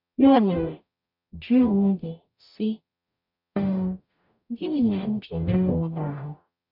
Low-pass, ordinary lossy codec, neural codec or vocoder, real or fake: 5.4 kHz; none; codec, 44.1 kHz, 0.9 kbps, DAC; fake